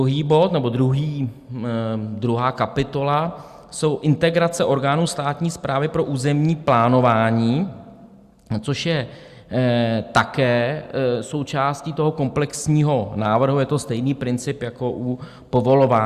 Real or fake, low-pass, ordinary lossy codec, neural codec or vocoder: real; 14.4 kHz; Opus, 64 kbps; none